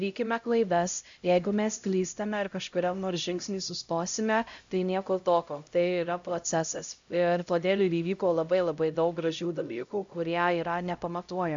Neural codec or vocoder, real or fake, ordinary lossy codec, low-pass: codec, 16 kHz, 0.5 kbps, X-Codec, HuBERT features, trained on LibriSpeech; fake; AAC, 64 kbps; 7.2 kHz